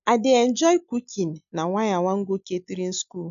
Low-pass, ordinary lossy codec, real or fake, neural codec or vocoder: 7.2 kHz; none; real; none